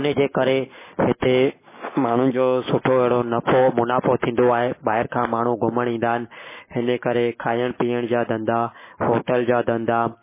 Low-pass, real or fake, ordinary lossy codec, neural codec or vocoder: 3.6 kHz; real; MP3, 16 kbps; none